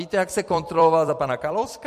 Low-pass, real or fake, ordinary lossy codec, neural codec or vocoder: 14.4 kHz; fake; MP3, 64 kbps; vocoder, 44.1 kHz, 128 mel bands every 256 samples, BigVGAN v2